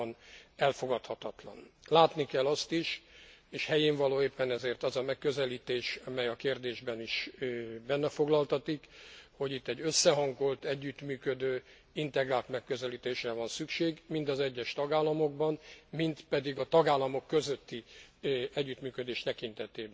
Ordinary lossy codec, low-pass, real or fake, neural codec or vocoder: none; none; real; none